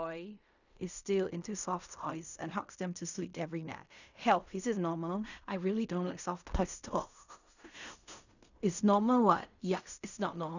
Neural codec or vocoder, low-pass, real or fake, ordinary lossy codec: codec, 16 kHz in and 24 kHz out, 0.4 kbps, LongCat-Audio-Codec, fine tuned four codebook decoder; 7.2 kHz; fake; none